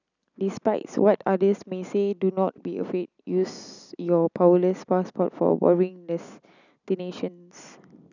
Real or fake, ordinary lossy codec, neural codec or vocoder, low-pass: real; none; none; 7.2 kHz